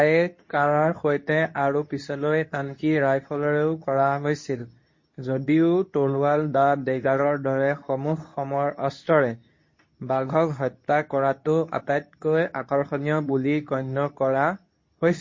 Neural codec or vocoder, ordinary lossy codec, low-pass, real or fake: codec, 24 kHz, 0.9 kbps, WavTokenizer, medium speech release version 2; MP3, 32 kbps; 7.2 kHz; fake